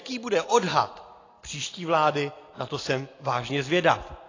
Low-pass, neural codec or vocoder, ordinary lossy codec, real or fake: 7.2 kHz; none; AAC, 32 kbps; real